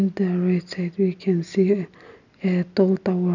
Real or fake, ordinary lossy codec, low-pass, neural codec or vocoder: real; none; 7.2 kHz; none